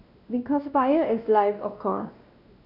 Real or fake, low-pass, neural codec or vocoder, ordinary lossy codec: fake; 5.4 kHz; codec, 16 kHz, 1 kbps, X-Codec, WavLM features, trained on Multilingual LibriSpeech; none